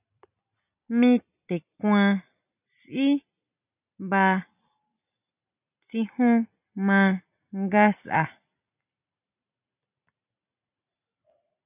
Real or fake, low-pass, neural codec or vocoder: fake; 3.6 kHz; vocoder, 44.1 kHz, 128 mel bands every 256 samples, BigVGAN v2